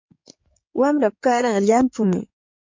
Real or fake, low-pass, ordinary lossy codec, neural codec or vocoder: fake; 7.2 kHz; MP3, 48 kbps; codec, 16 kHz in and 24 kHz out, 2.2 kbps, FireRedTTS-2 codec